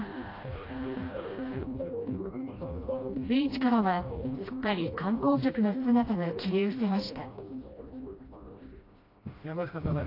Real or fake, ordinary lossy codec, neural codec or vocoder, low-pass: fake; AAC, 32 kbps; codec, 16 kHz, 1 kbps, FreqCodec, smaller model; 5.4 kHz